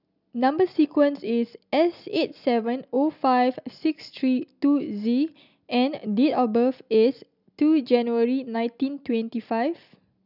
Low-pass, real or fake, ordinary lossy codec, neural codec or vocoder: 5.4 kHz; real; none; none